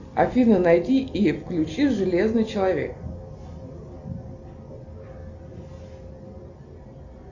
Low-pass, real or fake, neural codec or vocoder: 7.2 kHz; real; none